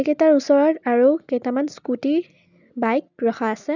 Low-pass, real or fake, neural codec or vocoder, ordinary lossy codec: 7.2 kHz; real; none; none